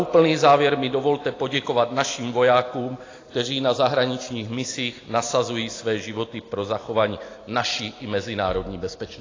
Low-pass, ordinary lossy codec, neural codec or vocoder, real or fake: 7.2 kHz; AAC, 32 kbps; none; real